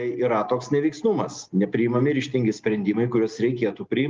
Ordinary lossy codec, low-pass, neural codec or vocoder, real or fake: Opus, 24 kbps; 7.2 kHz; none; real